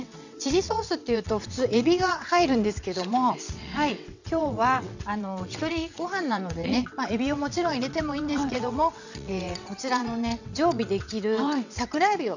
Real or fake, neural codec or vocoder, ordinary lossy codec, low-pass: fake; vocoder, 22.05 kHz, 80 mel bands, WaveNeXt; none; 7.2 kHz